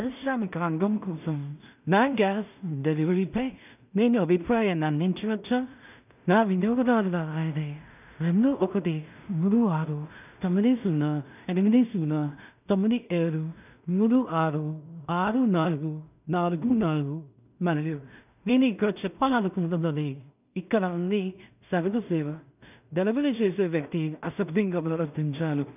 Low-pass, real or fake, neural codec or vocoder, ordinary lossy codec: 3.6 kHz; fake; codec, 16 kHz in and 24 kHz out, 0.4 kbps, LongCat-Audio-Codec, two codebook decoder; none